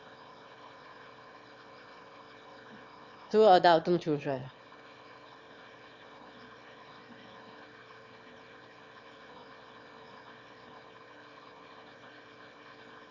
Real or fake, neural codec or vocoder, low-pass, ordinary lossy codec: fake; autoencoder, 22.05 kHz, a latent of 192 numbers a frame, VITS, trained on one speaker; 7.2 kHz; Opus, 64 kbps